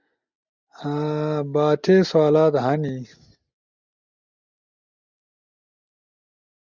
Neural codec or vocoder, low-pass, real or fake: none; 7.2 kHz; real